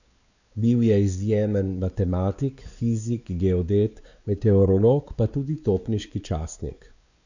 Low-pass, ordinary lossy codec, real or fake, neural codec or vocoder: 7.2 kHz; none; fake; codec, 16 kHz, 4 kbps, X-Codec, WavLM features, trained on Multilingual LibriSpeech